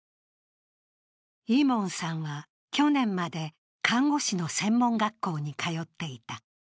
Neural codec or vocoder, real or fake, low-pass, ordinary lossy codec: none; real; none; none